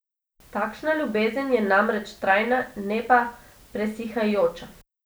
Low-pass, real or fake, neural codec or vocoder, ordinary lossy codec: none; real; none; none